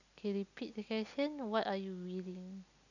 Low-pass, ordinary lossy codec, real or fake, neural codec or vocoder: 7.2 kHz; none; real; none